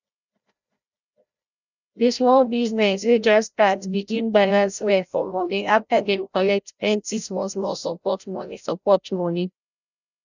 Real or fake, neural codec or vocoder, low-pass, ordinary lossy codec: fake; codec, 16 kHz, 0.5 kbps, FreqCodec, larger model; 7.2 kHz; none